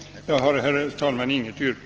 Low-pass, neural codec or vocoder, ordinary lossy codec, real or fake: 7.2 kHz; none; Opus, 16 kbps; real